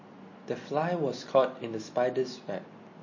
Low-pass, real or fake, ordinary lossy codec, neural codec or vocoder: 7.2 kHz; real; MP3, 32 kbps; none